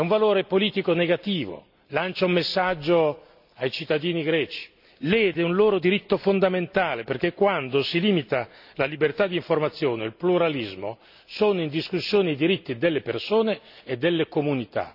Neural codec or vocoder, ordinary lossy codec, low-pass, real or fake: none; none; 5.4 kHz; real